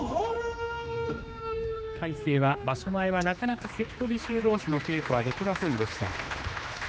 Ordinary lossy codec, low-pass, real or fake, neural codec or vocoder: none; none; fake; codec, 16 kHz, 2 kbps, X-Codec, HuBERT features, trained on general audio